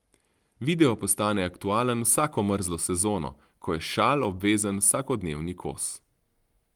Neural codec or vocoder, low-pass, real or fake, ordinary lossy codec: none; 19.8 kHz; real; Opus, 24 kbps